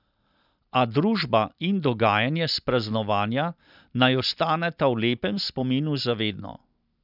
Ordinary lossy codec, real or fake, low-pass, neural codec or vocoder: none; real; 5.4 kHz; none